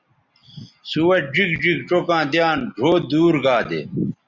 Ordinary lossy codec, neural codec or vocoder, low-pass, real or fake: Opus, 64 kbps; none; 7.2 kHz; real